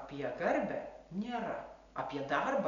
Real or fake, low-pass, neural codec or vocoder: real; 7.2 kHz; none